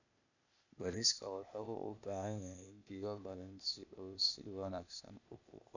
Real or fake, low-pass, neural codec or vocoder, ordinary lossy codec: fake; 7.2 kHz; codec, 16 kHz, 0.8 kbps, ZipCodec; none